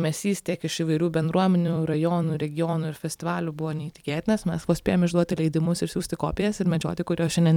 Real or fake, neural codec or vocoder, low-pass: fake; vocoder, 44.1 kHz, 128 mel bands every 256 samples, BigVGAN v2; 19.8 kHz